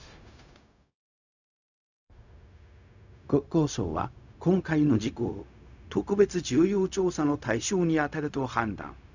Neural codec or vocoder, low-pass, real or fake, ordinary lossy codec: codec, 16 kHz, 0.4 kbps, LongCat-Audio-Codec; 7.2 kHz; fake; none